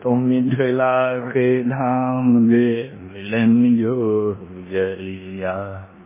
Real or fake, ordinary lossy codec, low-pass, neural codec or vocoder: fake; MP3, 16 kbps; 3.6 kHz; codec, 16 kHz in and 24 kHz out, 0.9 kbps, LongCat-Audio-Codec, four codebook decoder